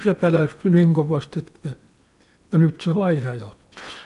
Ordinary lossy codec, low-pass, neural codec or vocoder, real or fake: MP3, 96 kbps; 10.8 kHz; codec, 16 kHz in and 24 kHz out, 0.8 kbps, FocalCodec, streaming, 65536 codes; fake